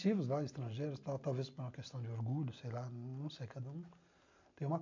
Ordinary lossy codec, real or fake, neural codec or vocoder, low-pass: MP3, 64 kbps; real; none; 7.2 kHz